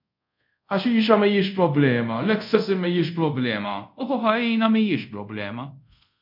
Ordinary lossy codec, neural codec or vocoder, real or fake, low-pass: MP3, 48 kbps; codec, 24 kHz, 0.5 kbps, DualCodec; fake; 5.4 kHz